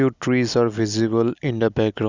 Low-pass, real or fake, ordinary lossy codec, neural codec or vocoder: 7.2 kHz; real; Opus, 64 kbps; none